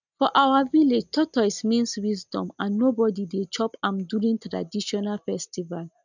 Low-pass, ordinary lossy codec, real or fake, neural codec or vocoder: 7.2 kHz; none; real; none